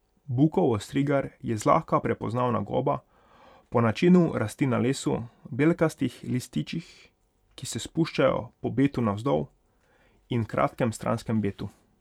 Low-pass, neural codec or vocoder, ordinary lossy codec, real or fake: 19.8 kHz; vocoder, 44.1 kHz, 128 mel bands every 512 samples, BigVGAN v2; none; fake